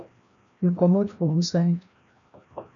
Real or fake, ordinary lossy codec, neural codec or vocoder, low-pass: fake; MP3, 96 kbps; codec, 16 kHz, 1 kbps, FreqCodec, larger model; 7.2 kHz